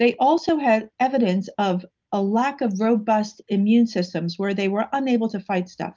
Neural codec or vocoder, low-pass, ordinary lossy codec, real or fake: none; 7.2 kHz; Opus, 24 kbps; real